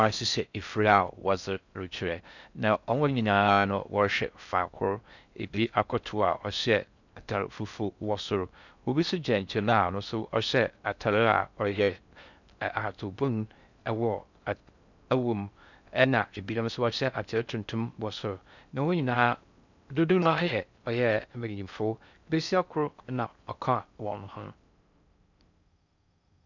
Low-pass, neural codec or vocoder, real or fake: 7.2 kHz; codec, 16 kHz in and 24 kHz out, 0.6 kbps, FocalCodec, streaming, 4096 codes; fake